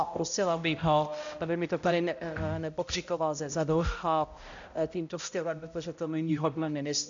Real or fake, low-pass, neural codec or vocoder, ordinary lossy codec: fake; 7.2 kHz; codec, 16 kHz, 0.5 kbps, X-Codec, HuBERT features, trained on balanced general audio; AAC, 64 kbps